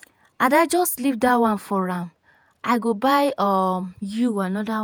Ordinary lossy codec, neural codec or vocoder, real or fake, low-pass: none; vocoder, 48 kHz, 128 mel bands, Vocos; fake; none